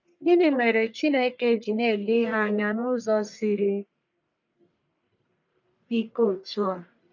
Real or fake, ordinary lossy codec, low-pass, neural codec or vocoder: fake; none; 7.2 kHz; codec, 44.1 kHz, 1.7 kbps, Pupu-Codec